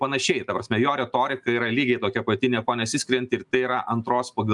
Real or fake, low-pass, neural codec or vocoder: real; 10.8 kHz; none